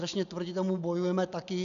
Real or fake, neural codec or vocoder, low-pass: real; none; 7.2 kHz